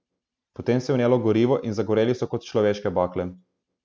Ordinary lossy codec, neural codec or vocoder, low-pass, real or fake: none; none; none; real